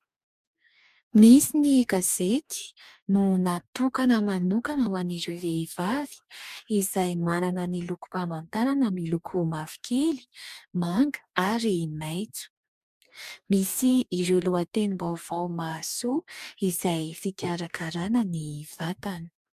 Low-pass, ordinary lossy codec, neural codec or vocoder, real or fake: 14.4 kHz; MP3, 96 kbps; codec, 44.1 kHz, 2.6 kbps, DAC; fake